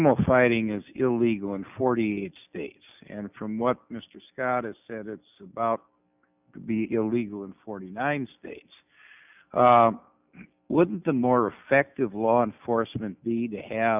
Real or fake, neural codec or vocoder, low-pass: real; none; 3.6 kHz